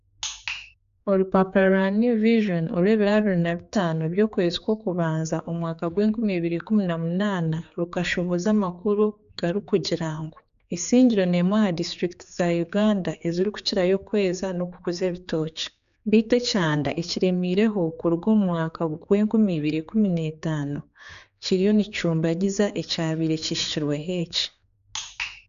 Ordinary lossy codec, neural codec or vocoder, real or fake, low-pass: none; codec, 16 kHz, 4 kbps, X-Codec, HuBERT features, trained on general audio; fake; 7.2 kHz